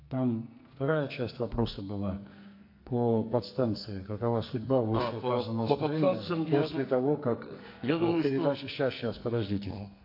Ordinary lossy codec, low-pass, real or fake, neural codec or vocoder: AAC, 32 kbps; 5.4 kHz; fake; codec, 44.1 kHz, 2.6 kbps, SNAC